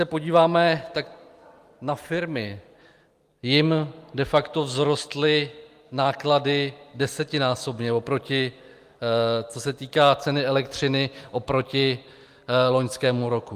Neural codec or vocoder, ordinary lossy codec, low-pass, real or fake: none; Opus, 32 kbps; 14.4 kHz; real